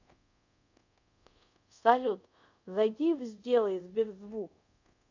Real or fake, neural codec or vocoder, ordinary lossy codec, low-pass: fake; codec, 24 kHz, 0.5 kbps, DualCodec; none; 7.2 kHz